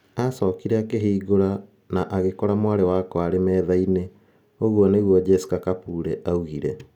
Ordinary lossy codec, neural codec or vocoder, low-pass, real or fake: none; none; 19.8 kHz; real